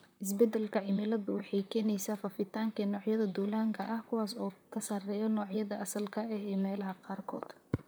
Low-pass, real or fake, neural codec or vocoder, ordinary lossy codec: none; fake; vocoder, 44.1 kHz, 128 mel bands, Pupu-Vocoder; none